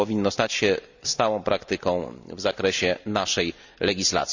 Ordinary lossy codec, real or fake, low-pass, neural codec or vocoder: none; real; 7.2 kHz; none